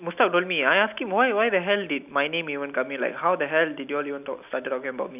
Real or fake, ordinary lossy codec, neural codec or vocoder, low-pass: real; none; none; 3.6 kHz